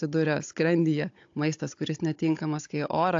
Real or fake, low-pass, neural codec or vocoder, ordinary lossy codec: fake; 7.2 kHz; codec, 16 kHz, 16 kbps, FunCodec, trained on Chinese and English, 50 frames a second; AAC, 48 kbps